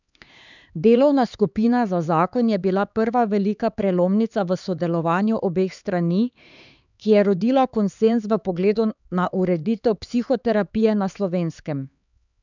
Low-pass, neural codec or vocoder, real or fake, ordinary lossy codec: 7.2 kHz; codec, 16 kHz, 4 kbps, X-Codec, HuBERT features, trained on LibriSpeech; fake; none